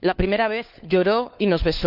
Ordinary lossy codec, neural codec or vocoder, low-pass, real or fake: none; codec, 16 kHz, 4 kbps, FunCodec, trained on LibriTTS, 50 frames a second; 5.4 kHz; fake